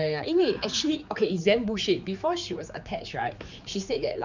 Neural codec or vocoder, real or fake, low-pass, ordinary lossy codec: codec, 16 kHz, 4 kbps, X-Codec, HuBERT features, trained on general audio; fake; 7.2 kHz; none